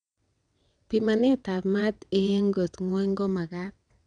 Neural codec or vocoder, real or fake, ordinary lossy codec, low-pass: vocoder, 22.05 kHz, 80 mel bands, WaveNeXt; fake; none; 9.9 kHz